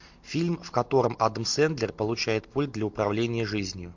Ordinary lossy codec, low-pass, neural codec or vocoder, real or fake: MP3, 48 kbps; 7.2 kHz; none; real